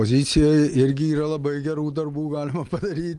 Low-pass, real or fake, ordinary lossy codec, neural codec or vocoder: 10.8 kHz; real; Opus, 32 kbps; none